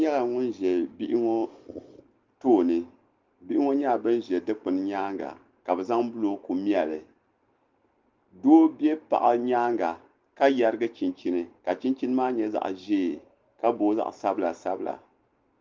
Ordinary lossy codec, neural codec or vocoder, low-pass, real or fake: Opus, 32 kbps; none; 7.2 kHz; real